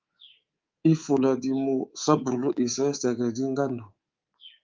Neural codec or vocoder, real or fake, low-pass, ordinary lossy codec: codec, 24 kHz, 3.1 kbps, DualCodec; fake; 7.2 kHz; Opus, 32 kbps